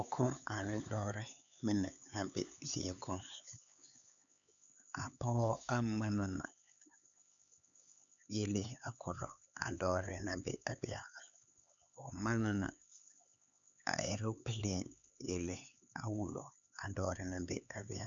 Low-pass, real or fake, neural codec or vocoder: 7.2 kHz; fake; codec, 16 kHz, 4 kbps, X-Codec, HuBERT features, trained on LibriSpeech